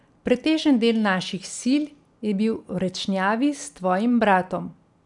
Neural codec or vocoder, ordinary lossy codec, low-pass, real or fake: none; none; 10.8 kHz; real